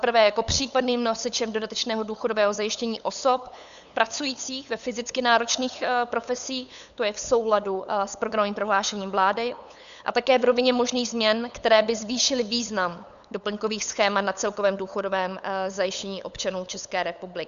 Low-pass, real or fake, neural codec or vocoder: 7.2 kHz; fake; codec, 16 kHz, 8 kbps, FunCodec, trained on LibriTTS, 25 frames a second